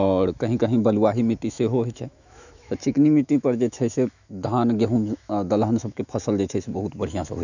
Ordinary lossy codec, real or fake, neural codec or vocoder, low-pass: none; fake; autoencoder, 48 kHz, 128 numbers a frame, DAC-VAE, trained on Japanese speech; 7.2 kHz